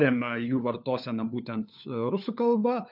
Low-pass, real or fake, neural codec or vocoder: 5.4 kHz; fake; codec, 16 kHz, 4 kbps, FunCodec, trained on LibriTTS, 50 frames a second